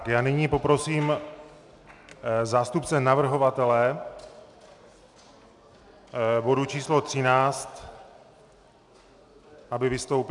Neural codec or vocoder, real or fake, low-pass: none; real; 10.8 kHz